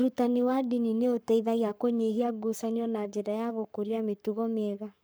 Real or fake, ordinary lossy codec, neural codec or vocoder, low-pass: fake; none; codec, 44.1 kHz, 7.8 kbps, Pupu-Codec; none